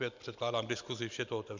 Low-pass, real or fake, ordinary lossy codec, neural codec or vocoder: 7.2 kHz; real; MP3, 64 kbps; none